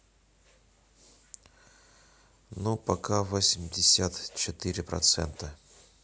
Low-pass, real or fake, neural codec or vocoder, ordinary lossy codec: none; real; none; none